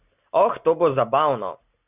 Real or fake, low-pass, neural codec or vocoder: real; 3.6 kHz; none